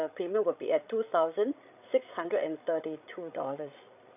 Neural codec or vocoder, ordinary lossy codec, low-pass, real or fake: codec, 16 kHz, 16 kbps, FreqCodec, larger model; none; 3.6 kHz; fake